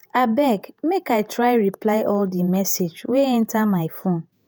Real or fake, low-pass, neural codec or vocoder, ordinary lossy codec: fake; none; vocoder, 48 kHz, 128 mel bands, Vocos; none